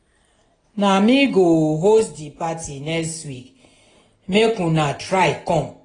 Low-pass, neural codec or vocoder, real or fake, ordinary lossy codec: 9.9 kHz; none; real; AAC, 32 kbps